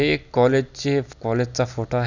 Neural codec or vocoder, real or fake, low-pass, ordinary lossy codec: none; real; 7.2 kHz; none